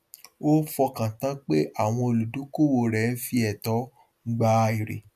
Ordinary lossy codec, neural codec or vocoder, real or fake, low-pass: none; none; real; 14.4 kHz